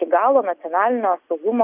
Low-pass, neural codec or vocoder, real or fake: 3.6 kHz; none; real